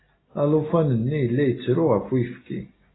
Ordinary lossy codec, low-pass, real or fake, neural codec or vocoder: AAC, 16 kbps; 7.2 kHz; real; none